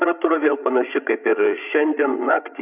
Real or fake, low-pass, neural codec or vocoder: fake; 3.6 kHz; codec, 16 kHz, 16 kbps, FreqCodec, larger model